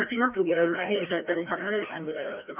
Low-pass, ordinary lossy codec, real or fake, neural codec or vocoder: 3.6 kHz; none; fake; codec, 16 kHz, 1 kbps, FreqCodec, larger model